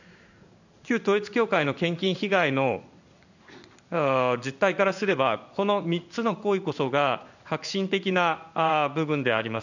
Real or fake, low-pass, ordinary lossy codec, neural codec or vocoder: fake; 7.2 kHz; none; codec, 16 kHz in and 24 kHz out, 1 kbps, XY-Tokenizer